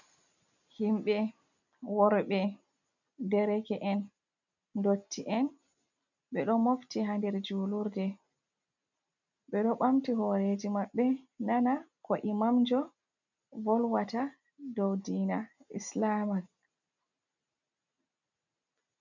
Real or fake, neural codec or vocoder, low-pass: real; none; 7.2 kHz